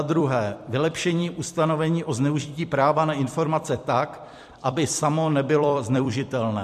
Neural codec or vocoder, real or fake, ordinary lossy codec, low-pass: vocoder, 44.1 kHz, 128 mel bands every 256 samples, BigVGAN v2; fake; MP3, 64 kbps; 14.4 kHz